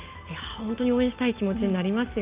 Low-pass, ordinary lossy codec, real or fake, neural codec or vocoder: 3.6 kHz; Opus, 24 kbps; real; none